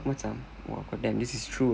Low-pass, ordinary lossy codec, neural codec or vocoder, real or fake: none; none; none; real